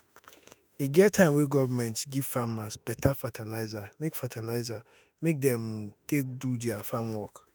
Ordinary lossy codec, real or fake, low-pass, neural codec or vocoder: none; fake; none; autoencoder, 48 kHz, 32 numbers a frame, DAC-VAE, trained on Japanese speech